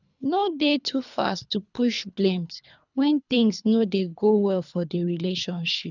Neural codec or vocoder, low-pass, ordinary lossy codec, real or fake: codec, 24 kHz, 3 kbps, HILCodec; 7.2 kHz; none; fake